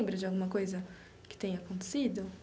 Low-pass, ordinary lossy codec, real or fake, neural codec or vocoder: none; none; real; none